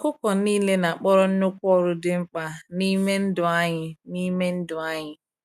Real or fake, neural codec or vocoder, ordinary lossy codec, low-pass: real; none; none; 14.4 kHz